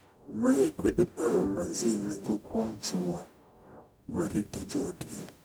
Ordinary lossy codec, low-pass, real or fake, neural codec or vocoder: none; none; fake; codec, 44.1 kHz, 0.9 kbps, DAC